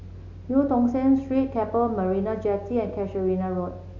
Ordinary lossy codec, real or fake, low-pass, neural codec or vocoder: AAC, 48 kbps; real; 7.2 kHz; none